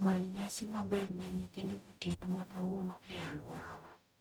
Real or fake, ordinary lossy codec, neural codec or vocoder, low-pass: fake; none; codec, 44.1 kHz, 0.9 kbps, DAC; none